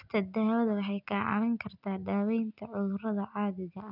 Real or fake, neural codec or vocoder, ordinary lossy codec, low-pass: real; none; none; 5.4 kHz